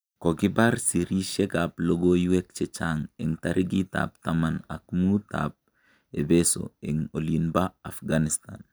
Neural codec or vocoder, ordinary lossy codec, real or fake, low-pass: none; none; real; none